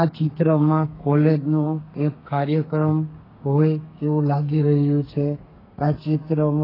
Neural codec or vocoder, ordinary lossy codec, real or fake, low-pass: codec, 32 kHz, 1.9 kbps, SNAC; AAC, 24 kbps; fake; 5.4 kHz